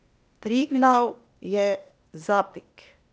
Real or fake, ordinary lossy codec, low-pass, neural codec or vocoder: fake; none; none; codec, 16 kHz, 0.8 kbps, ZipCodec